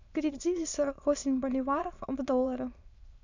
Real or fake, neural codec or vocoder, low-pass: fake; autoencoder, 22.05 kHz, a latent of 192 numbers a frame, VITS, trained on many speakers; 7.2 kHz